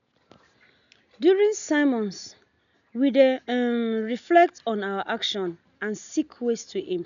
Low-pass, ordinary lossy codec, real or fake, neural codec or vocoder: 7.2 kHz; none; real; none